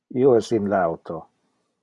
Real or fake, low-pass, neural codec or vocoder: real; 10.8 kHz; none